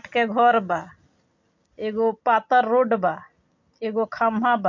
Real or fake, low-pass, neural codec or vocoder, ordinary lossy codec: real; 7.2 kHz; none; MP3, 48 kbps